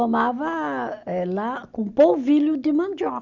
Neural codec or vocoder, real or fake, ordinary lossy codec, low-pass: none; real; Opus, 64 kbps; 7.2 kHz